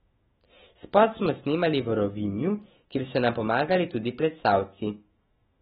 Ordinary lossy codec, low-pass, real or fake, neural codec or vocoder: AAC, 16 kbps; 19.8 kHz; fake; autoencoder, 48 kHz, 128 numbers a frame, DAC-VAE, trained on Japanese speech